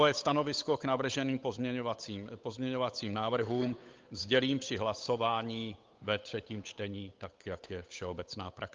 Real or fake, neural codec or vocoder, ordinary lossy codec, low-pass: fake; codec, 16 kHz, 8 kbps, FunCodec, trained on Chinese and English, 25 frames a second; Opus, 24 kbps; 7.2 kHz